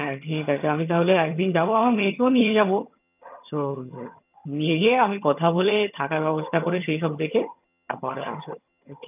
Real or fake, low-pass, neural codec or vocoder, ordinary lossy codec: fake; 3.6 kHz; vocoder, 22.05 kHz, 80 mel bands, HiFi-GAN; none